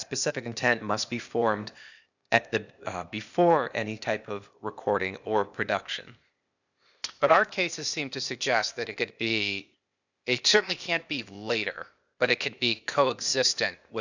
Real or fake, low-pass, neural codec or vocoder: fake; 7.2 kHz; codec, 16 kHz, 0.8 kbps, ZipCodec